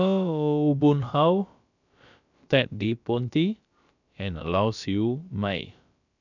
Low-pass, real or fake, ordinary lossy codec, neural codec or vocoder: 7.2 kHz; fake; none; codec, 16 kHz, about 1 kbps, DyCAST, with the encoder's durations